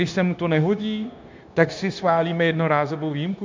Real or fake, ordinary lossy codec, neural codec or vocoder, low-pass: fake; MP3, 64 kbps; codec, 16 kHz, 0.9 kbps, LongCat-Audio-Codec; 7.2 kHz